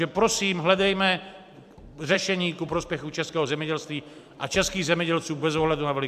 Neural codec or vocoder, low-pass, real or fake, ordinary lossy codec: vocoder, 44.1 kHz, 128 mel bands every 512 samples, BigVGAN v2; 14.4 kHz; fake; MP3, 96 kbps